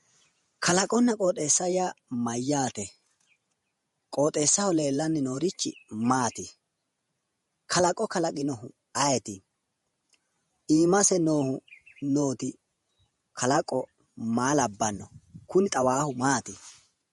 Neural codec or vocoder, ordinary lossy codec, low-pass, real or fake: vocoder, 48 kHz, 128 mel bands, Vocos; MP3, 48 kbps; 19.8 kHz; fake